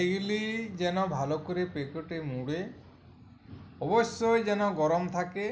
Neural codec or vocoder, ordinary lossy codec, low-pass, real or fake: none; none; none; real